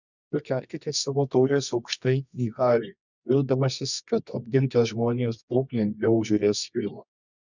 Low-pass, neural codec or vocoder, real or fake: 7.2 kHz; codec, 24 kHz, 0.9 kbps, WavTokenizer, medium music audio release; fake